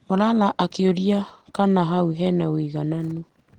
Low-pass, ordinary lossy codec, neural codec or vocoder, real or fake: 19.8 kHz; Opus, 16 kbps; none; real